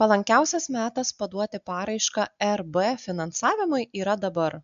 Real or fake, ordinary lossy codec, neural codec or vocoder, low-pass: real; AAC, 96 kbps; none; 7.2 kHz